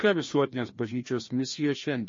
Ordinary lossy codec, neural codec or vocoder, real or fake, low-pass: MP3, 32 kbps; codec, 16 kHz, 1 kbps, FreqCodec, larger model; fake; 7.2 kHz